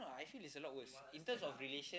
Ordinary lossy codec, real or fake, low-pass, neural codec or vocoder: none; real; none; none